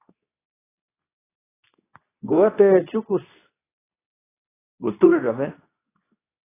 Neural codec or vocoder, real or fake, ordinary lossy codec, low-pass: codec, 24 kHz, 0.9 kbps, WavTokenizer, medium speech release version 2; fake; AAC, 16 kbps; 3.6 kHz